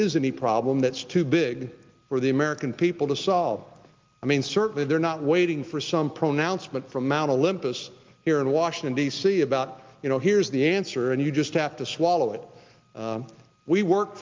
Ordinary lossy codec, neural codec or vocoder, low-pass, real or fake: Opus, 24 kbps; none; 7.2 kHz; real